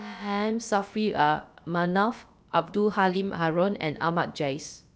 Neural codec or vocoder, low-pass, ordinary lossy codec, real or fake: codec, 16 kHz, about 1 kbps, DyCAST, with the encoder's durations; none; none; fake